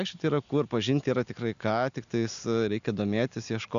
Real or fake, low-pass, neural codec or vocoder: real; 7.2 kHz; none